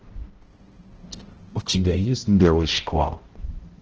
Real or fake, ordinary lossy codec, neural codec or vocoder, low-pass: fake; Opus, 16 kbps; codec, 16 kHz, 0.5 kbps, X-Codec, HuBERT features, trained on balanced general audio; 7.2 kHz